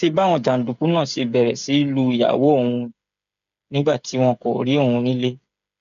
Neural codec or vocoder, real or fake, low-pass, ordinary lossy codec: codec, 16 kHz, 8 kbps, FreqCodec, smaller model; fake; 7.2 kHz; none